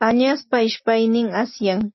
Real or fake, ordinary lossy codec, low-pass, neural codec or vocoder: real; MP3, 24 kbps; 7.2 kHz; none